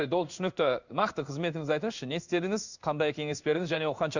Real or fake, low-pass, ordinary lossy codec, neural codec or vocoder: fake; 7.2 kHz; none; codec, 16 kHz in and 24 kHz out, 1 kbps, XY-Tokenizer